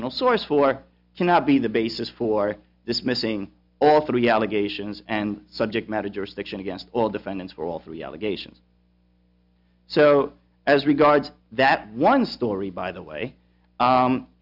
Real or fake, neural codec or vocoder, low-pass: real; none; 5.4 kHz